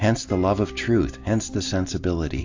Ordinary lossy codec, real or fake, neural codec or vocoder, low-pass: AAC, 48 kbps; real; none; 7.2 kHz